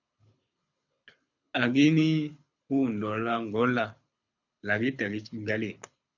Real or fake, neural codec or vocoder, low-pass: fake; codec, 24 kHz, 6 kbps, HILCodec; 7.2 kHz